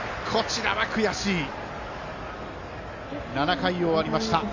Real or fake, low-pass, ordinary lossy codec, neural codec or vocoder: real; 7.2 kHz; AAC, 48 kbps; none